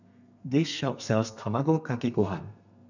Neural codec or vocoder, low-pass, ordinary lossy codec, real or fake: codec, 32 kHz, 1.9 kbps, SNAC; 7.2 kHz; none; fake